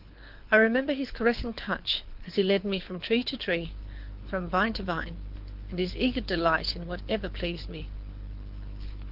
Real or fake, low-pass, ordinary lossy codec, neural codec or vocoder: fake; 5.4 kHz; Opus, 32 kbps; codec, 24 kHz, 6 kbps, HILCodec